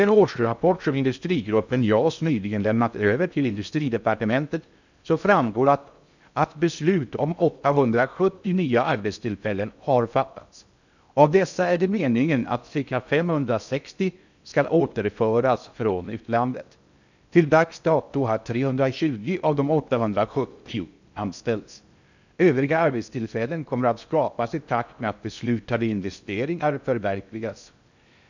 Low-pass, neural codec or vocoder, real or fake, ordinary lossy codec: 7.2 kHz; codec, 16 kHz in and 24 kHz out, 0.6 kbps, FocalCodec, streaming, 2048 codes; fake; none